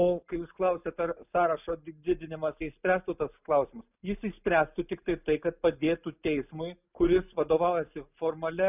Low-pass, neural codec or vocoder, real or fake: 3.6 kHz; none; real